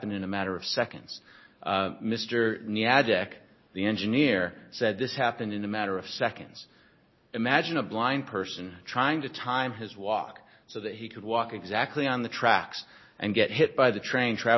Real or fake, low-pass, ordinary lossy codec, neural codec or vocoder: real; 7.2 kHz; MP3, 24 kbps; none